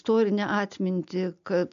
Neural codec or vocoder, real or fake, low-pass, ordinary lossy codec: none; real; 7.2 kHz; AAC, 96 kbps